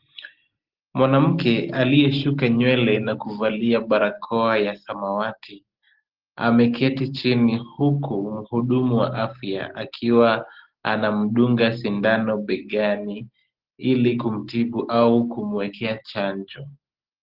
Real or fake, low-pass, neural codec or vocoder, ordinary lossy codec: real; 5.4 kHz; none; Opus, 16 kbps